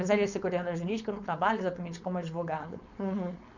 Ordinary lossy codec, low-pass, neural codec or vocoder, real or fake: none; 7.2 kHz; codec, 16 kHz, 4.8 kbps, FACodec; fake